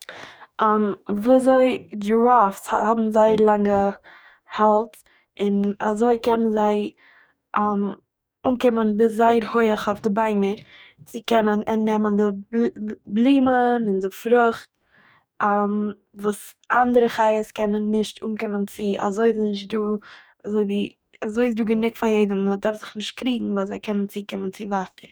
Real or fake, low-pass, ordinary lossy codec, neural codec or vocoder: fake; none; none; codec, 44.1 kHz, 2.6 kbps, DAC